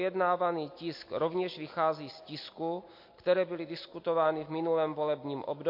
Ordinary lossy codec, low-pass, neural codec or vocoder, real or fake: MP3, 32 kbps; 5.4 kHz; none; real